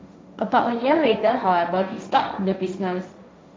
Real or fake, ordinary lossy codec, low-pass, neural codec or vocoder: fake; none; none; codec, 16 kHz, 1.1 kbps, Voila-Tokenizer